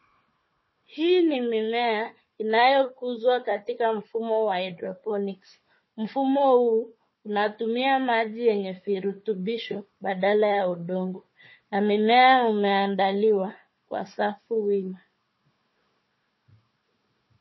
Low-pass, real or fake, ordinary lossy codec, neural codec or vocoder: 7.2 kHz; fake; MP3, 24 kbps; codec, 16 kHz, 4 kbps, FunCodec, trained on Chinese and English, 50 frames a second